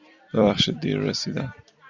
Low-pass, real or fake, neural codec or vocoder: 7.2 kHz; fake; vocoder, 44.1 kHz, 128 mel bands every 256 samples, BigVGAN v2